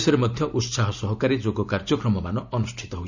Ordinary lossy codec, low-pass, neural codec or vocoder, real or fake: none; 7.2 kHz; none; real